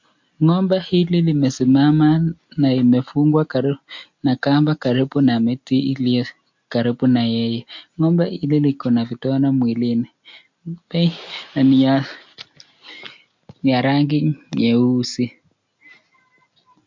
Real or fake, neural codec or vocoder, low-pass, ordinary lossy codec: real; none; 7.2 kHz; MP3, 48 kbps